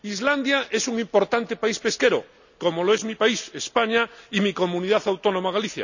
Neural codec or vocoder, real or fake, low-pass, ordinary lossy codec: none; real; 7.2 kHz; none